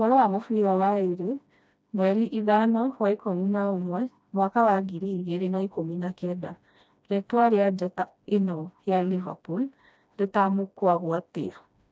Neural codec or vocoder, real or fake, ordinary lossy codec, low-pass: codec, 16 kHz, 1 kbps, FreqCodec, smaller model; fake; none; none